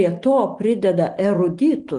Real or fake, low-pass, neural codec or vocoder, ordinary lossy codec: real; 10.8 kHz; none; Opus, 24 kbps